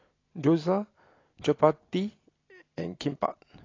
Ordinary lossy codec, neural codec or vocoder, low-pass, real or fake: AAC, 32 kbps; none; 7.2 kHz; real